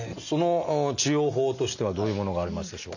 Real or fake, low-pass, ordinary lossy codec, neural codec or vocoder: real; 7.2 kHz; none; none